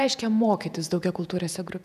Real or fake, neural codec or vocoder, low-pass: fake; vocoder, 48 kHz, 128 mel bands, Vocos; 14.4 kHz